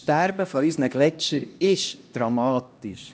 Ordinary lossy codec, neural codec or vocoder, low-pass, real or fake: none; codec, 16 kHz, 1 kbps, X-Codec, HuBERT features, trained on LibriSpeech; none; fake